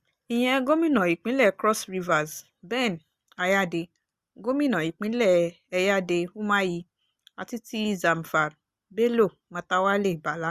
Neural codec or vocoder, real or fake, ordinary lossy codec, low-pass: none; real; Opus, 64 kbps; 14.4 kHz